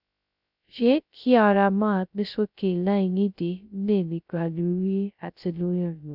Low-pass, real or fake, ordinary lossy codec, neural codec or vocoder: 5.4 kHz; fake; none; codec, 16 kHz, 0.2 kbps, FocalCodec